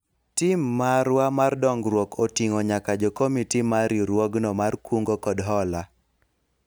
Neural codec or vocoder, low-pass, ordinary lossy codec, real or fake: none; none; none; real